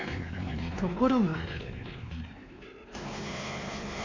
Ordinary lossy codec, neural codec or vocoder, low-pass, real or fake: AAC, 32 kbps; codec, 16 kHz, 2 kbps, X-Codec, WavLM features, trained on Multilingual LibriSpeech; 7.2 kHz; fake